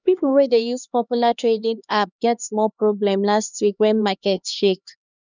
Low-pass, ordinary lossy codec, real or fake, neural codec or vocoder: 7.2 kHz; none; fake; codec, 16 kHz, 2 kbps, X-Codec, HuBERT features, trained on LibriSpeech